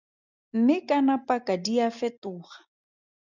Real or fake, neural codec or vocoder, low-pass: real; none; 7.2 kHz